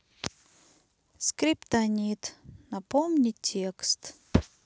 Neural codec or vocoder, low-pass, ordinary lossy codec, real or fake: none; none; none; real